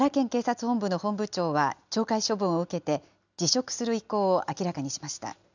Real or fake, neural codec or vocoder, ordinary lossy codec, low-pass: real; none; none; 7.2 kHz